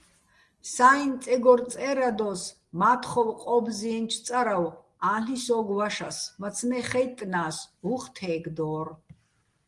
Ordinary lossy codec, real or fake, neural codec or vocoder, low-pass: Opus, 24 kbps; real; none; 10.8 kHz